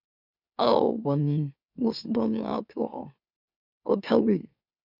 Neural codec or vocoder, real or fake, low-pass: autoencoder, 44.1 kHz, a latent of 192 numbers a frame, MeloTTS; fake; 5.4 kHz